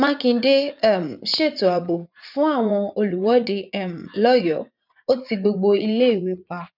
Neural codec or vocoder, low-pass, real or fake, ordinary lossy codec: vocoder, 44.1 kHz, 80 mel bands, Vocos; 5.4 kHz; fake; none